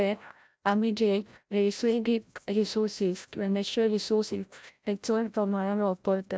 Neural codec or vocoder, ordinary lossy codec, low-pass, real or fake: codec, 16 kHz, 0.5 kbps, FreqCodec, larger model; none; none; fake